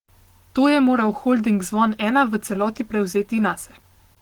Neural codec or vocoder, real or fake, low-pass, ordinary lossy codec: codec, 44.1 kHz, 7.8 kbps, Pupu-Codec; fake; 19.8 kHz; Opus, 16 kbps